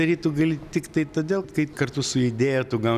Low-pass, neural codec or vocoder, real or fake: 14.4 kHz; none; real